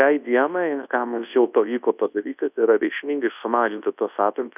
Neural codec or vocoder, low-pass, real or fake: codec, 24 kHz, 0.9 kbps, WavTokenizer, large speech release; 3.6 kHz; fake